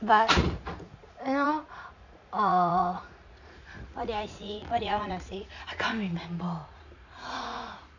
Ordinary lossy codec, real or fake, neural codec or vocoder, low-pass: none; fake; vocoder, 44.1 kHz, 80 mel bands, Vocos; 7.2 kHz